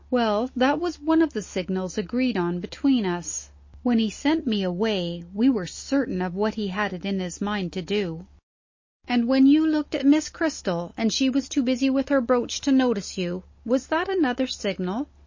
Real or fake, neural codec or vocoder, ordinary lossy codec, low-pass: real; none; MP3, 32 kbps; 7.2 kHz